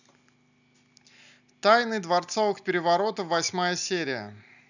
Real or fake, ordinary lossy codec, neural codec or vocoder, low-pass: real; none; none; 7.2 kHz